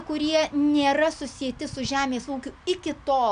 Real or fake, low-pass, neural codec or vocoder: real; 9.9 kHz; none